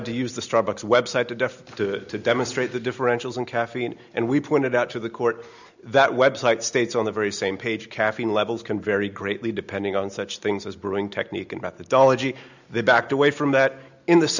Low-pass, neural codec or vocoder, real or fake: 7.2 kHz; none; real